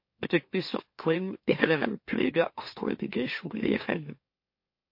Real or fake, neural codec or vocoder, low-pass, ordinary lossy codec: fake; autoencoder, 44.1 kHz, a latent of 192 numbers a frame, MeloTTS; 5.4 kHz; MP3, 32 kbps